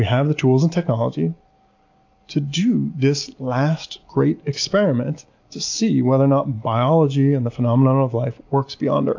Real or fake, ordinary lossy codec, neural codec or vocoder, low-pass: real; AAC, 48 kbps; none; 7.2 kHz